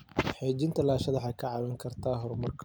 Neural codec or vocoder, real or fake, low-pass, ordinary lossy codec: none; real; none; none